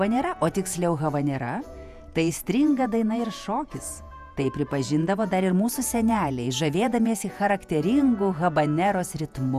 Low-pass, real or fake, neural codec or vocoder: 14.4 kHz; fake; vocoder, 48 kHz, 128 mel bands, Vocos